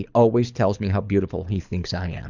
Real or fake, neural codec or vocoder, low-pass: fake; codec, 24 kHz, 6 kbps, HILCodec; 7.2 kHz